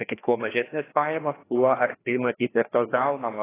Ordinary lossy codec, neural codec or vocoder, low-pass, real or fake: AAC, 16 kbps; codec, 16 kHz, 1 kbps, FreqCodec, larger model; 3.6 kHz; fake